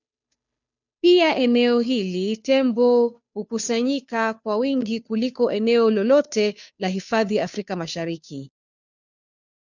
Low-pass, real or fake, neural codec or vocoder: 7.2 kHz; fake; codec, 16 kHz, 2 kbps, FunCodec, trained on Chinese and English, 25 frames a second